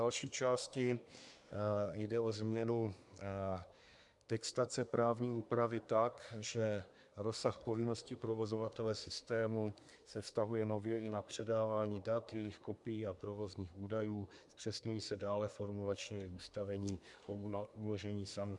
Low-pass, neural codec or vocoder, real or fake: 10.8 kHz; codec, 24 kHz, 1 kbps, SNAC; fake